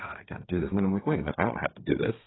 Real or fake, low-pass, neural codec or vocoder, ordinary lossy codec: fake; 7.2 kHz; codec, 32 kHz, 1.9 kbps, SNAC; AAC, 16 kbps